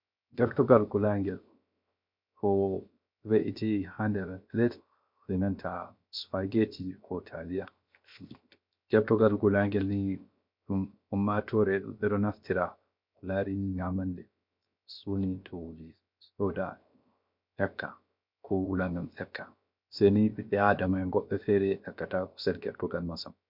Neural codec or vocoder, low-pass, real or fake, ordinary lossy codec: codec, 16 kHz, 0.7 kbps, FocalCodec; 5.4 kHz; fake; MP3, 48 kbps